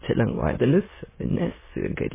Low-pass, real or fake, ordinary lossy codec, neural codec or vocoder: 3.6 kHz; fake; MP3, 16 kbps; autoencoder, 22.05 kHz, a latent of 192 numbers a frame, VITS, trained on many speakers